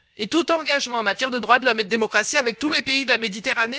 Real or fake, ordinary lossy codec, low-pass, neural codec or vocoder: fake; none; none; codec, 16 kHz, about 1 kbps, DyCAST, with the encoder's durations